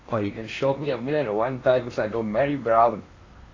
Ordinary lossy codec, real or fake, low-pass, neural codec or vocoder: AAC, 32 kbps; fake; 7.2 kHz; codec, 16 kHz in and 24 kHz out, 0.8 kbps, FocalCodec, streaming, 65536 codes